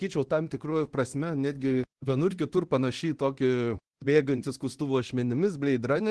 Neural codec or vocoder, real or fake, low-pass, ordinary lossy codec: codec, 24 kHz, 0.9 kbps, DualCodec; fake; 10.8 kHz; Opus, 16 kbps